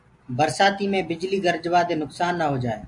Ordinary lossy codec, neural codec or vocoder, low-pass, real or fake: AAC, 64 kbps; none; 10.8 kHz; real